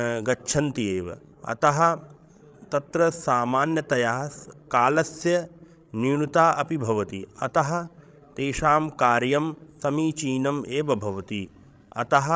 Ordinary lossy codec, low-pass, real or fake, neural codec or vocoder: none; none; fake; codec, 16 kHz, 16 kbps, FreqCodec, larger model